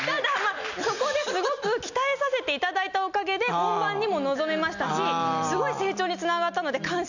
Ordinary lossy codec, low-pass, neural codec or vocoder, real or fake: none; 7.2 kHz; none; real